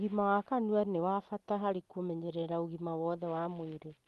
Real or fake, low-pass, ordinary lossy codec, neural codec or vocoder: real; 14.4 kHz; Opus, 24 kbps; none